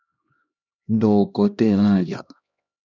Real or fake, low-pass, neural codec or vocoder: fake; 7.2 kHz; codec, 16 kHz, 1 kbps, X-Codec, HuBERT features, trained on LibriSpeech